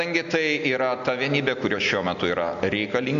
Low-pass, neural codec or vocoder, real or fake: 7.2 kHz; none; real